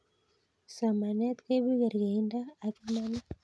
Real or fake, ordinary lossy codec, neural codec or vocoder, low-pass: real; none; none; none